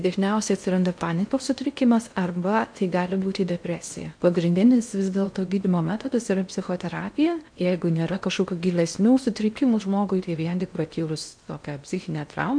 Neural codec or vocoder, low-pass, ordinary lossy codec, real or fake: codec, 16 kHz in and 24 kHz out, 0.6 kbps, FocalCodec, streaming, 2048 codes; 9.9 kHz; MP3, 64 kbps; fake